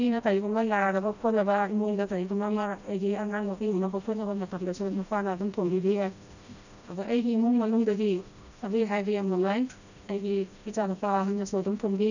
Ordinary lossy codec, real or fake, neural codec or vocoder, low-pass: none; fake; codec, 16 kHz, 1 kbps, FreqCodec, smaller model; 7.2 kHz